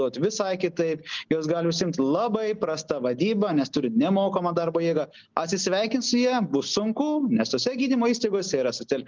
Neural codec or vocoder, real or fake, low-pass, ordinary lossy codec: none; real; 7.2 kHz; Opus, 24 kbps